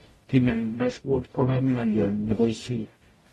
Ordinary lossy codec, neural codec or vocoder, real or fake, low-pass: AAC, 32 kbps; codec, 44.1 kHz, 0.9 kbps, DAC; fake; 19.8 kHz